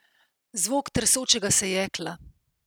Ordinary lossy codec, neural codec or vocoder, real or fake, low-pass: none; none; real; none